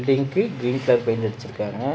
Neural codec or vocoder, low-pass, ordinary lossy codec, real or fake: none; none; none; real